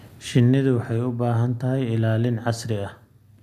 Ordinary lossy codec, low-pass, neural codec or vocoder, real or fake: none; 14.4 kHz; none; real